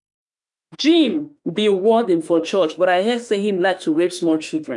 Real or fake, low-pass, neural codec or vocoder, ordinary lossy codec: fake; 10.8 kHz; autoencoder, 48 kHz, 32 numbers a frame, DAC-VAE, trained on Japanese speech; none